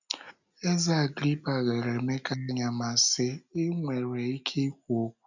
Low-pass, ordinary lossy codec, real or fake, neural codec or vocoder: 7.2 kHz; none; real; none